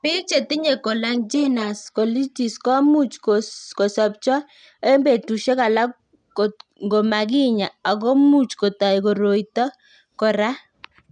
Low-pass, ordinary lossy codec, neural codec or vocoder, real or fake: 9.9 kHz; none; none; real